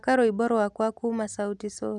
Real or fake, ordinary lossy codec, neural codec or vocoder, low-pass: real; none; none; none